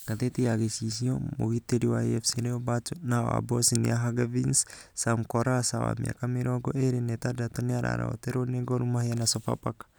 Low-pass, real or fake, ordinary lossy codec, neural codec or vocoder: none; real; none; none